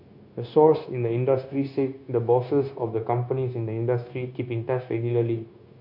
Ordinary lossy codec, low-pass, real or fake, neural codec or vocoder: AAC, 48 kbps; 5.4 kHz; fake; codec, 16 kHz, 0.9 kbps, LongCat-Audio-Codec